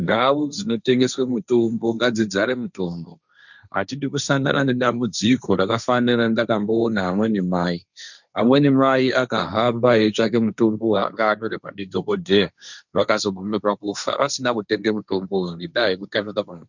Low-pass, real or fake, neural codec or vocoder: 7.2 kHz; fake; codec, 16 kHz, 1.1 kbps, Voila-Tokenizer